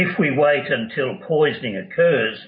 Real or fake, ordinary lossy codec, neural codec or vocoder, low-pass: real; MP3, 24 kbps; none; 7.2 kHz